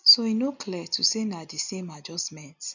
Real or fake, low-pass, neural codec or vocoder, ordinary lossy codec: real; 7.2 kHz; none; none